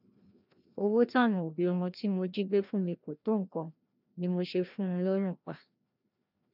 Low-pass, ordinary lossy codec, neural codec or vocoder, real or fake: 5.4 kHz; none; codec, 16 kHz, 1 kbps, FreqCodec, larger model; fake